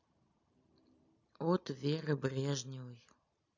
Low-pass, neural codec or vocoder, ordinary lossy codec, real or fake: 7.2 kHz; none; none; real